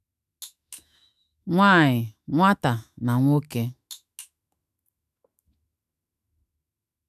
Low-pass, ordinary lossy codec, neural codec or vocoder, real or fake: 14.4 kHz; none; autoencoder, 48 kHz, 128 numbers a frame, DAC-VAE, trained on Japanese speech; fake